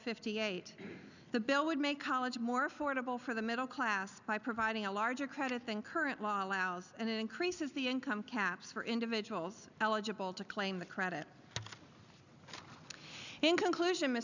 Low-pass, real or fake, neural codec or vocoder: 7.2 kHz; real; none